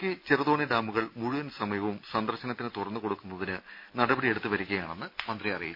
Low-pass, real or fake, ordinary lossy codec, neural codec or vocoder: 5.4 kHz; real; none; none